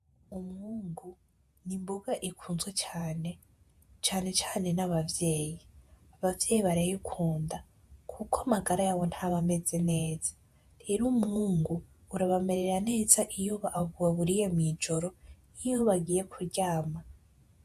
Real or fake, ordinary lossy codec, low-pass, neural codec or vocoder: fake; AAC, 96 kbps; 14.4 kHz; vocoder, 48 kHz, 128 mel bands, Vocos